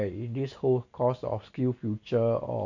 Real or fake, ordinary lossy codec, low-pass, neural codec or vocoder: real; none; 7.2 kHz; none